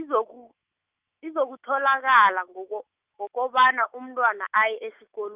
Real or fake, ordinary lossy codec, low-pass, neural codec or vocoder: fake; Opus, 24 kbps; 3.6 kHz; autoencoder, 48 kHz, 128 numbers a frame, DAC-VAE, trained on Japanese speech